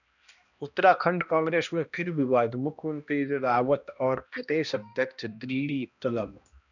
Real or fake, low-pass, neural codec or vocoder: fake; 7.2 kHz; codec, 16 kHz, 1 kbps, X-Codec, HuBERT features, trained on balanced general audio